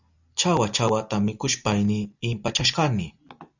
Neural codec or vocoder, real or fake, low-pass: none; real; 7.2 kHz